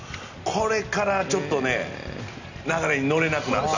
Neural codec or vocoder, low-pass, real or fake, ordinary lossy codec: none; 7.2 kHz; real; none